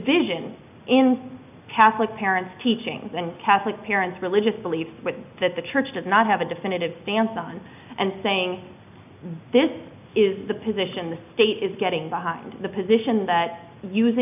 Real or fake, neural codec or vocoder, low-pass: real; none; 3.6 kHz